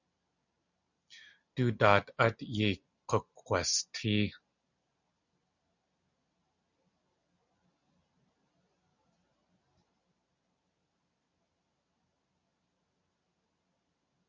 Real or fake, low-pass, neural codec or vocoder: real; 7.2 kHz; none